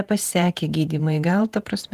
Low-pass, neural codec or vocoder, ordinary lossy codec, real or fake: 14.4 kHz; vocoder, 48 kHz, 128 mel bands, Vocos; Opus, 24 kbps; fake